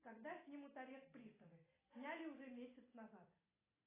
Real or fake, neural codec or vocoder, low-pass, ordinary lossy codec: real; none; 3.6 kHz; AAC, 16 kbps